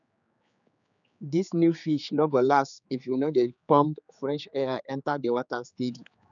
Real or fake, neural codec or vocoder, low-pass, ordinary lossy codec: fake; codec, 16 kHz, 4 kbps, X-Codec, HuBERT features, trained on general audio; 7.2 kHz; none